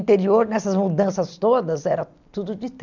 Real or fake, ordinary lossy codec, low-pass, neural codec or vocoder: real; none; 7.2 kHz; none